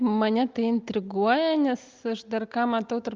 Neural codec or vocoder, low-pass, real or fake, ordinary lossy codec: none; 7.2 kHz; real; Opus, 24 kbps